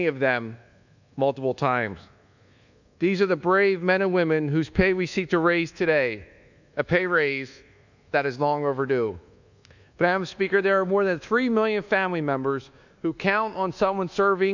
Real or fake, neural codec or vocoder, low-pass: fake; codec, 24 kHz, 1.2 kbps, DualCodec; 7.2 kHz